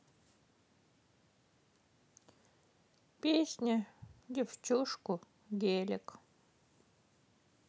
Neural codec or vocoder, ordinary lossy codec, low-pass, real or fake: none; none; none; real